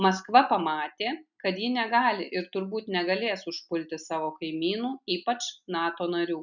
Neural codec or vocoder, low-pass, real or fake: none; 7.2 kHz; real